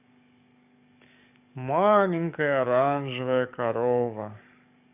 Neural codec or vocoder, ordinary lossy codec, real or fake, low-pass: codec, 44.1 kHz, 7.8 kbps, DAC; none; fake; 3.6 kHz